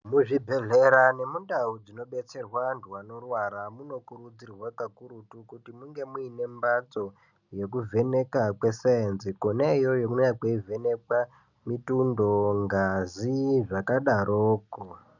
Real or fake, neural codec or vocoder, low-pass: real; none; 7.2 kHz